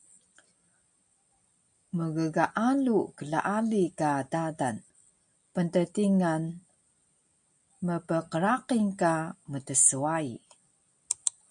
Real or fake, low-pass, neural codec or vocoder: real; 9.9 kHz; none